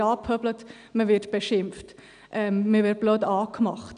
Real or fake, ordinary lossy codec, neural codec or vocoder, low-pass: real; none; none; 9.9 kHz